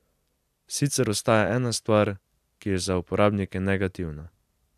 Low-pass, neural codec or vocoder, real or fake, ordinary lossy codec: 14.4 kHz; none; real; AAC, 96 kbps